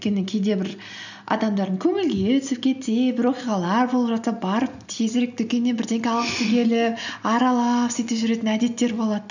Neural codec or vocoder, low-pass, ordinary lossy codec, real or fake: none; 7.2 kHz; none; real